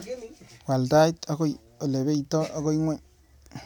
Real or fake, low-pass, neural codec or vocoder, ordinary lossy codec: real; none; none; none